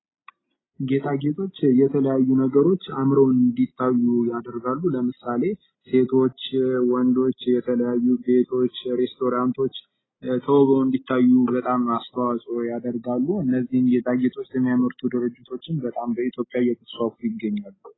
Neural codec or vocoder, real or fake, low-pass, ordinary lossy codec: none; real; 7.2 kHz; AAC, 16 kbps